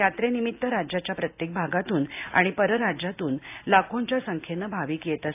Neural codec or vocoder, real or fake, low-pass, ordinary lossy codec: none; real; 3.6 kHz; AAC, 32 kbps